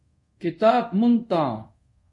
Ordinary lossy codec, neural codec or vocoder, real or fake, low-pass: MP3, 48 kbps; codec, 24 kHz, 0.5 kbps, DualCodec; fake; 10.8 kHz